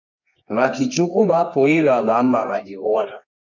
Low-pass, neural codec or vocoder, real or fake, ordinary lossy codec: 7.2 kHz; codec, 24 kHz, 0.9 kbps, WavTokenizer, medium music audio release; fake; MP3, 64 kbps